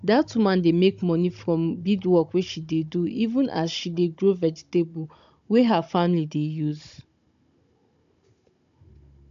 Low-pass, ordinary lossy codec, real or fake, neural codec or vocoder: 7.2 kHz; AAC, 64 kbps; fake; codec, 16 kHz, 16 kbps, FunCodec, trained on Chinese and English, 50 frames a second